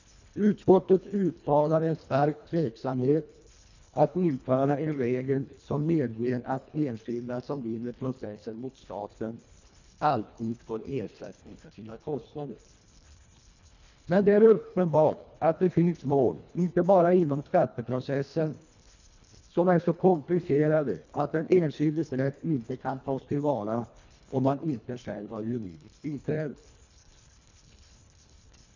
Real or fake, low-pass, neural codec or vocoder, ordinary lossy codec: fake; 7.2 kHz; codec, 24 kHz, 1.5 kbps, HILCodec; none